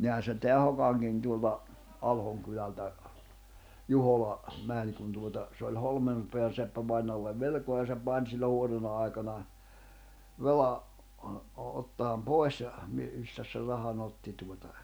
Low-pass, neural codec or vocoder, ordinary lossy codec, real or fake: none; none; none; real